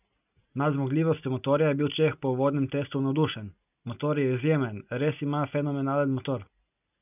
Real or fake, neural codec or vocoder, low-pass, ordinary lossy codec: real; none; 3.6 kHz; none